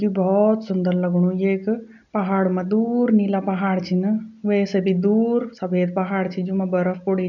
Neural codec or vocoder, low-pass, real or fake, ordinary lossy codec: none; 7.2 kHz; real; none